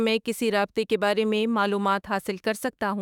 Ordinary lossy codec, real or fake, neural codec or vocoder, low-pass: none; fake; autoencoder, 48 kHz, 32 numbers a frame, DAC-VAE, trained on Japanese speech; 19.8 kHz